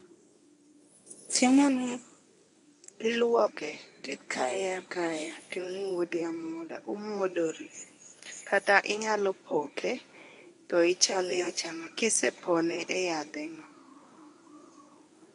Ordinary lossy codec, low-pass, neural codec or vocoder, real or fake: none; 10.8 kHz; codec, 24 kHz, 0.9 kbps, WavTokenizer, medium speech release version 1; fake